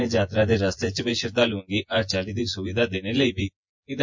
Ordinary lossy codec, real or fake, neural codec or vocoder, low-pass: MP3, 48 kbps; fake; vocoder, 24 kHz, 100 mel bands, Vocos; 7.2 kHz